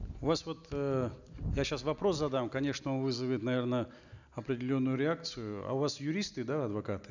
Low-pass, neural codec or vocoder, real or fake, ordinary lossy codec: 7.2 kHz; none; real; none